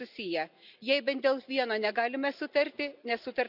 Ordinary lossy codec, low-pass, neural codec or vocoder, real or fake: none; 5.4 kHz; none; real